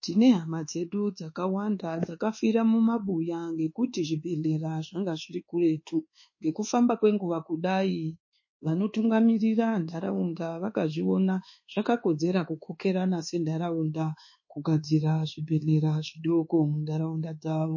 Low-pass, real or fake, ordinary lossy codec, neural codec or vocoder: 7.2 kHz; fake; MP3, 32 kbps; codec, 24 kHz, 1.2 kbps, DualCodec